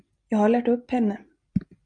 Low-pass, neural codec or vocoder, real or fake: 9.9 kHz; none; real